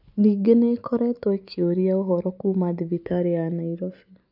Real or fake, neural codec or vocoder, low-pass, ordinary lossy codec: real; none; 5.4 kHz; AAC, 48 kbps